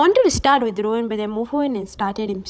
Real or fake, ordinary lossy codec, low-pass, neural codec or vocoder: fake; none; none; codec, 16 kHz, 16 kbps, FunCodec, trained on Chinese and English, 50 frames a second